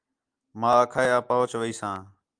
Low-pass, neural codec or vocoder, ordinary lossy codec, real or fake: 9.9 kHz; none; Opus, 32 kbps; real